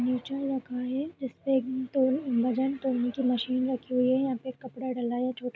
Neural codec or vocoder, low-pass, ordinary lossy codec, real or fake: none; none; none; real